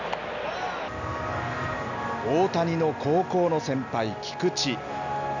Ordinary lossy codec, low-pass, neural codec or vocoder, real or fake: none; 7.2 kHz; none; real